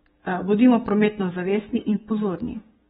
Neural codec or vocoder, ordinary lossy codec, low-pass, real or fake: codec, 16 kHz, 6 kbps, DAC; AAC, 16 kbps; 7.2 kHz; fake